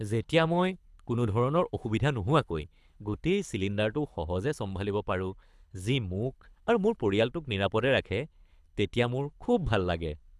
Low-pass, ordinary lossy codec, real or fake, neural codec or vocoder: none; none; fake; codec, 24 kHz, 6 kbps, HILCodec